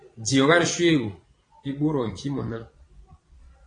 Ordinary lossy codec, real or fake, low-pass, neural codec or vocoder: AAC, 32 kbps; fake; 9.9 kHz; vocoder, 22.05 kHz, 80 mel bands, Vocos